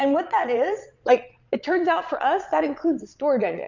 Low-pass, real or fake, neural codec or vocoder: 7.2 kHz; fake; vocoder, 22.05 kHz, 80 mel bands, Vocos